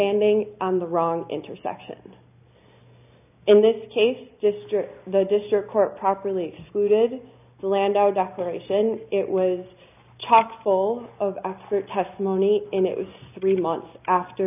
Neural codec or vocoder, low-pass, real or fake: none; 3.6 kHz; real